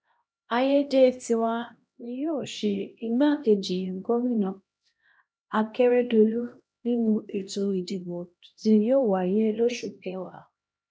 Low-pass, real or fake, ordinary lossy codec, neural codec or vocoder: none; fake; none; codec, 16 kHz, 1 kbps, X-Codec, HuBERT features, trained on LibriSpeech